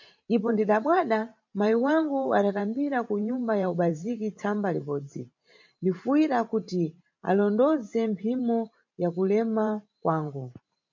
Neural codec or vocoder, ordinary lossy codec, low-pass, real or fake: vocoder, 44.1 kHz, 128 mel bands every 512 samples, BigVGAN v2; MP3, 48 kbps; 7.2 kHz; fake